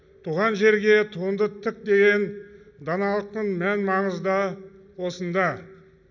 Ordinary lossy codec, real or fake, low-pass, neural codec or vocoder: none; real; 7.2 kHz; none